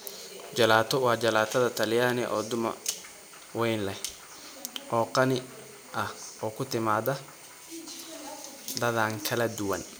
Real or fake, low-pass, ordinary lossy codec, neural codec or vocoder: real; none; none; none